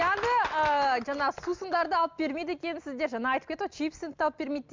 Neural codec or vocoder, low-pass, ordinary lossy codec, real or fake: none; 7.2 kHz; MP3, 64 kbps; real